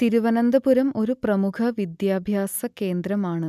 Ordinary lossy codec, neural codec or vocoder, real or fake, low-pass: none; none; real; 14.4 kHz